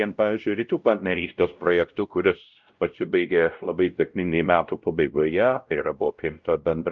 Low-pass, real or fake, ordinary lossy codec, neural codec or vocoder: 7.2 kHz; fake; Opus, 24 kbps; codec, 16 kHz, 0.5 kbps, X-Codec, WavLM features, trained on Multilingual LibriSpeech